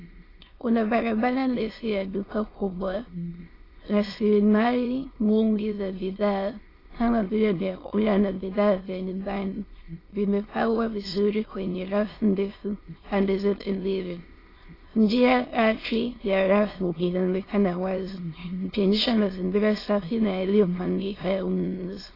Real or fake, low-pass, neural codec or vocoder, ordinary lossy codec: fake; 5.4 kHz; autoencoder, 22.05 kHz, a latent of 192 numbers a frame, VITS, trained on many speakers; AAC, 24 kbps